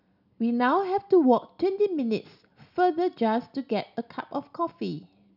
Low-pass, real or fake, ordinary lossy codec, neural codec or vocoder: 5.4 kHz; real; none; none